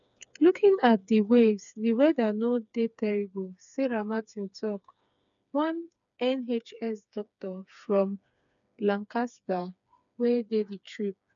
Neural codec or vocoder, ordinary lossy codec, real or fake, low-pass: codec, 16 kHz, 4 kbps, FreqCodec, smaller model; none; fake; 7.2 kHz